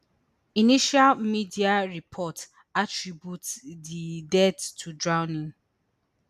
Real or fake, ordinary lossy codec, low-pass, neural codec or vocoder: real; none; 14.4 kHz; none